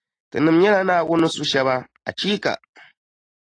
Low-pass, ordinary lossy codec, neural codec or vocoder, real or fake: 9.9 kHz; AAC, 32 kbps; none; real